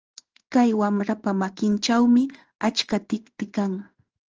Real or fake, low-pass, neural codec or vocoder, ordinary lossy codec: fake; 7.2 kHz; codec, 16 kHz in and 24 kHz out, 1 kbps, XY-Tokenizer; Opus, 24 kbps